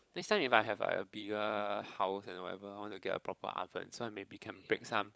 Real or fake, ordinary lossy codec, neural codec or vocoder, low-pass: fake; none; codec, 16 kHz, 16 kbps, FunCodec, trained on LibriTTS, 50 frames a second; none